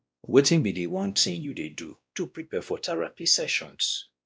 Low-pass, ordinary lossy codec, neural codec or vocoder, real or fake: none; none; codec, 16 kHz, 1 kbps, X-Codec, WavLM features, trained on Multilingual LibriSpeech; fake